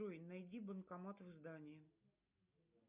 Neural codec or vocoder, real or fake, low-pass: none; real; 3.6 kHz